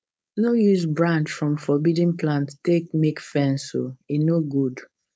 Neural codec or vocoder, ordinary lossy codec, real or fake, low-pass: codec, 16 kHz, 4.8 kbps, FACodec; none; fake; none